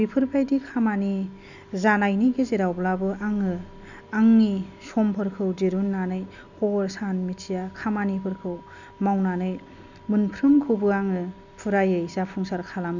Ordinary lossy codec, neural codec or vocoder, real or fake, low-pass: none; none; real; 7.2 kHz